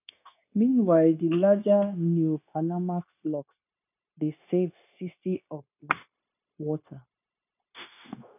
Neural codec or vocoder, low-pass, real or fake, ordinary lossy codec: codec, 16 kHz, 0.9 kbps, LongCat-Audio-Codec; 3.6 kHz; fake; none